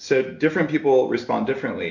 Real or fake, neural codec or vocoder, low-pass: real; none; 7.2 kHz